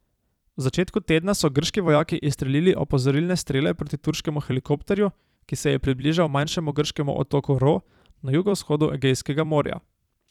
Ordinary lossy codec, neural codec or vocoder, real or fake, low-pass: none; vocoder, 44.1 kHz, 128 mel bands every 256 samples, BigVGAN v2; fake; 19.8 kHz